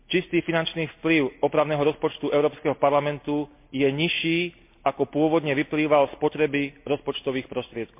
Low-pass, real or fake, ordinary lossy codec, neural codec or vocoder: 3.6 kHz; real; MP3, 32 kbps; none